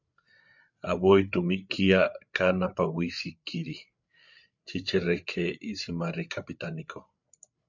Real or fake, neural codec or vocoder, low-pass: fake; codec, 16 kHz, 8 kbps, FreqCodec, larger model; 7.2 kHz